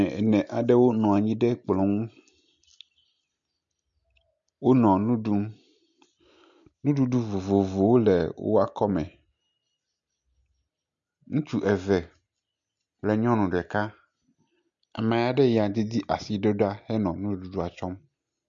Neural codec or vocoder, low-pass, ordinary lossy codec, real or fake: none; 7.2 kHz; MP3, 64 kbps; real